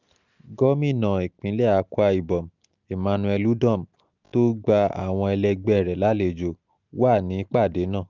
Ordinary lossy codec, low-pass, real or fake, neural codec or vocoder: none; 7.2 kHz; real; none